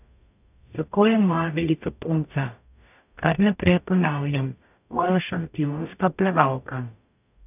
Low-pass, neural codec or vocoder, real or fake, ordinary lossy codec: 3.6 kHz; codec, 44.1 kHz, 0.9 kbps, DAC; fake; none